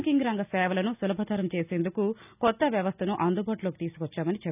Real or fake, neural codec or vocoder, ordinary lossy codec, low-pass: real; none; none; 3.6 kHz